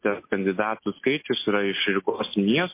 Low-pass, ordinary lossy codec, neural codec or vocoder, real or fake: 3.6 kHz; MP3, 24 kbps; none; real